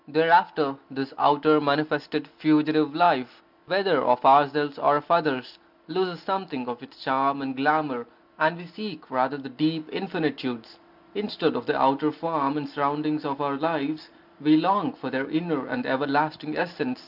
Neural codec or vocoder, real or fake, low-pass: none; real; 5.4 kHz